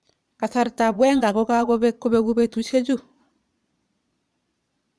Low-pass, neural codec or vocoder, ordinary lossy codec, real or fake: none; vocoder, 22.05 kHz, 80 mel bands, Vocos; none; fake